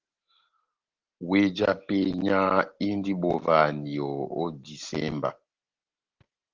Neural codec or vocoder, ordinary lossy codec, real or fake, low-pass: none; Opus, 16 kbps; real; 7.2 kHz